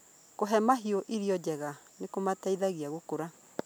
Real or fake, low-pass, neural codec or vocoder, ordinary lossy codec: real; none; none; none